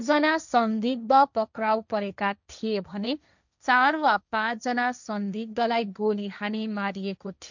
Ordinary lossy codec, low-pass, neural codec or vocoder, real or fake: none; 7.2 kHz; codec, 16 kHz, 1.1 kbps, Voila-Tokenizer; fake